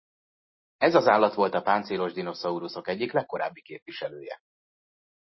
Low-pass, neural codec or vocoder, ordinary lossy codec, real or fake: 7.2 kHz; none; MP3, 24 kbps; real